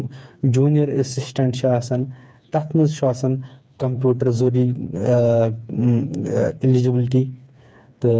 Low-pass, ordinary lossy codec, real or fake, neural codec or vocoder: none; none; fake; codec, 16 kHz, 4 kbps, FreqCodec, smaller model